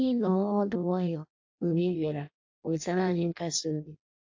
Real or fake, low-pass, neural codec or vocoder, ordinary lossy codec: fake; 7.2 kHz; codec, 16 kHz in and 24 kHz out, 0.6 kbps, FireRedTTS-2 codec; AAC, 48 kbps